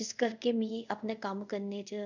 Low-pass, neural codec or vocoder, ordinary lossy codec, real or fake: 7.2 kHz; codec, 24 kHz, 0.5 kbps, DualCodec; none; fake